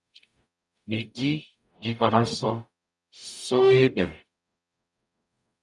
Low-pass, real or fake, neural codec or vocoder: 10.8 kHz; fake; codec, 44.1 kHz, 0.9 kbps, DAC